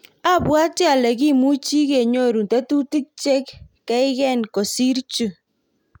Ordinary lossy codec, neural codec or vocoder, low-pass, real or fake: none; none; 19.8 kHz; real